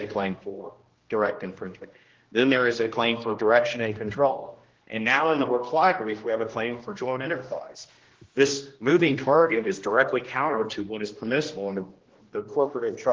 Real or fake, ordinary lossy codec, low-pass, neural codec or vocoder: fake; Opus, 16 kbps; 7.2 kHz; codec, 16 kHz, 1 kbps, X-Codec, HuBERT features, trained on general audio